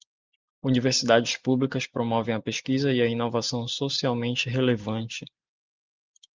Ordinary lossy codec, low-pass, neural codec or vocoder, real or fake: Opus, 24 kbps; 7.2 kHz; none; real